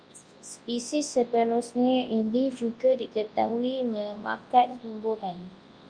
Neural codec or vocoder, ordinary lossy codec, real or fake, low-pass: codec, 24 kHz, 0.9 kbps, WavTokenizer, large speech release; Opus, 32 kbps; fake; 9.9 kHz